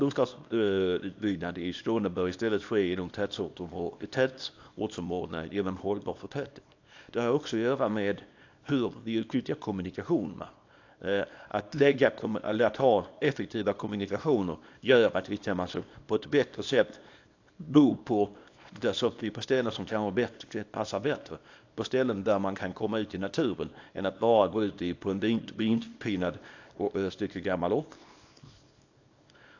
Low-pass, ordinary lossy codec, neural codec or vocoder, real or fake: 7.2 kHz; AAC, 48 kbps; codec, 24 kHz, 0.9 kbps, WavTokenizer, small release; fake